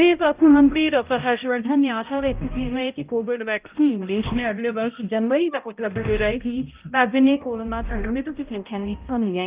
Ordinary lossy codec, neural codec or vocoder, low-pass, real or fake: Opus, 24 kbps; codec, 16 kHz, 0.5 kbps, X-Codec, HuBERT features, trained on balanced general audio; 3.6 kHz; fake